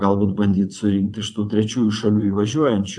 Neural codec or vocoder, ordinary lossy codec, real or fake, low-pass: vocoder, 22.05 kHz, 80 mel bands, WaveNeXt; AAC, 64 kbps; fake; 9.9 kHz